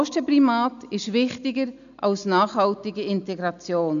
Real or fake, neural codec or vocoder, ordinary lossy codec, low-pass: real; none; none; 7.2 kHz